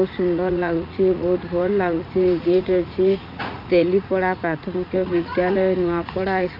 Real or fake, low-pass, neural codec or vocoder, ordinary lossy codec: fake; 5.4 kHz; vocoder, 44.1 kHz, 80 mel bands, Vocos; none